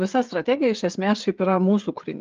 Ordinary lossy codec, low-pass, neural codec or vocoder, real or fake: Opus, 32 kbps; 7.2 kHz; codec, 16 kHz, 16 kbps, FreqCodec, smaller model; fake